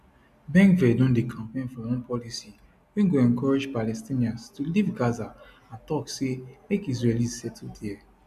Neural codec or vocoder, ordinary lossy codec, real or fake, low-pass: none; none; real; 14.4 kHz